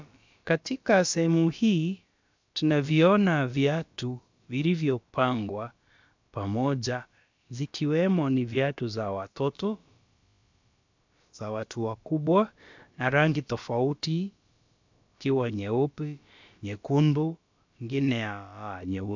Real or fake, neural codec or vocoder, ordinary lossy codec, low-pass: fake; codec, 16 kHz, about 1 kbps, DyCAST, with the encoder's durations; MP3, 64 kbps; 7.2 kHz